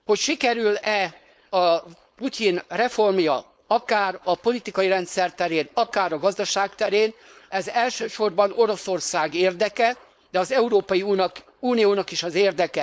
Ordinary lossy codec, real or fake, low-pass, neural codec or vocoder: none; fake; none; codec, 16 kHz, 4.8 kbps, FACodec